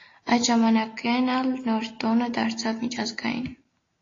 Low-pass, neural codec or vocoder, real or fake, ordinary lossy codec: 7.2 kHz; none; real; AAC, 32 kbps